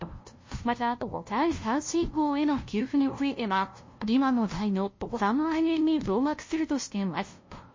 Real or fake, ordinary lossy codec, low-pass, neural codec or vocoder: fake; MP3, 32 kbps; 7.2 kHz; codec, 16 kHz, 0.5 kbps, FunCodec, trained on LibriTTS, 25 frames a second